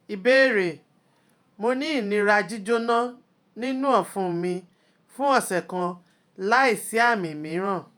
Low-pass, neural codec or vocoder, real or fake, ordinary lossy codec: none; vocoder, 48 kHz, 128 mel bands, Vocos; fake; none